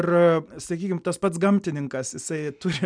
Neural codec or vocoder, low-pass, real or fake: none; 9.9 kHz; real